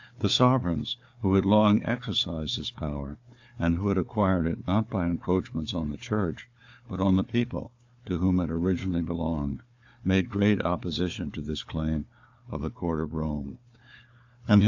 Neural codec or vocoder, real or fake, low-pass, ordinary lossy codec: codec, 16 kHz, 4 kbps, FunCodec, trained on Chinese and English, 50 frames a second; fake; 7.2 kHz; AAC, 48 kbps